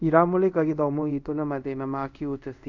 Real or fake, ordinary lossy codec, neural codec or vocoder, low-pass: fake; none; codec, 24 kHz, 0.5 kbps, DualCodec; 7.2 kHz